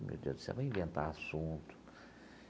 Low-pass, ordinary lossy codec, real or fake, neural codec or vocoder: none; none; real; none